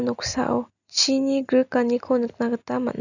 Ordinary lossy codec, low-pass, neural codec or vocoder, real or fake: none; 7.2 kHz; none; real